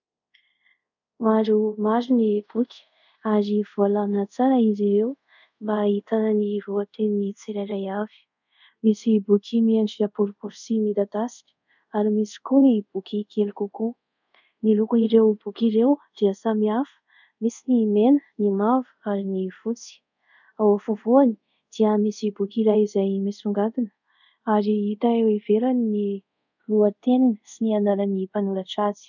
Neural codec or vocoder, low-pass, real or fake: codec, 24 kHz, 0.5 kbps, DualCodec; 7.2 kHz; fake